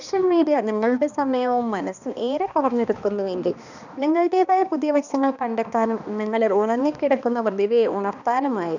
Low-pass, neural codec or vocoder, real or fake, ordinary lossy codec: 7.2 kHz; codec, 16 kHz, 2 kbps, X-Codec, HuBERT features, trained on balanced general audio; fake; none